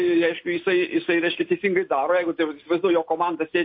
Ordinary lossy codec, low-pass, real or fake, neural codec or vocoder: MP3, 32 kbps; 5.4 kHz; real; none